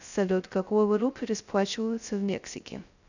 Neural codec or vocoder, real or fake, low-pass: codec, 16 kHz, 0.2 kbps, FocalCodec; fake; 7.2 kHz